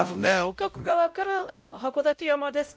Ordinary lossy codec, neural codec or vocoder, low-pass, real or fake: none; codec, 16 kHz, 0.5 kbps, X-Codec, WavLM features, trained on Multilingual LibriSpeech; none; fake